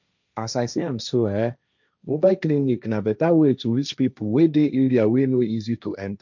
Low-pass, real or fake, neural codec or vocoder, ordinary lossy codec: 7.2 kHz; fake; codec, 16 kHz, 1.1 kbps, Voila-Tokenizer; none